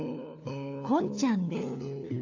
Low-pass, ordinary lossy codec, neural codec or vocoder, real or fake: 7.2 kHz; AAC, 48 kbps; codec, 16 kHz, 4 kbps, FunCodec, trained on LibriTTS, 50 frames a second; fake